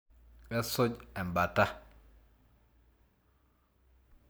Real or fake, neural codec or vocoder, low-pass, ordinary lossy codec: real; none; none; none